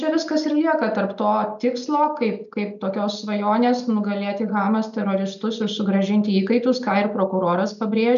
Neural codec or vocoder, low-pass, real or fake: none; 7.2 kHz; real